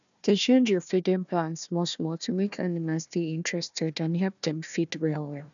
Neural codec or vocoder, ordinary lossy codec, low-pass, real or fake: codec, 16 kHz, 1 kbps, FunCodec, trained on Chinese and English, 50 frames a second; none; 7.2 kHz; fake